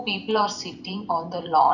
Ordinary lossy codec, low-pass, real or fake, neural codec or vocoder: none; 7.2 kHz; real; none